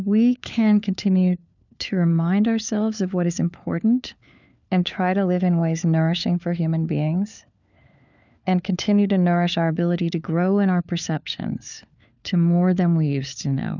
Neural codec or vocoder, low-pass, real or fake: codec, 16 kHz, 4 kbps, FunCodec, trained on LibriTTS, 50 frames a second; 7.2 kHz; fake